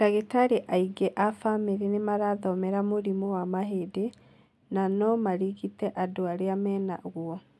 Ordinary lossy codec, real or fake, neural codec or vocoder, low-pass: none; real; none; none